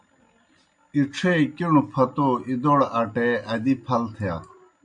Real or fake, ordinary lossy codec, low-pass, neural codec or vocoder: real; MP3, 48 kbps; 9.9 kHz; none